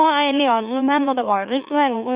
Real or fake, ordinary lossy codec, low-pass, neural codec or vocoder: fake; Opus, 64 kbps; 3.6 kHz; autoencoder, 44.1 kHz, a latent of 192 numbers a frame, MeloTTS